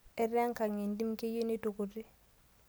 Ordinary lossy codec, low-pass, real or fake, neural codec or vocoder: none; none; real; none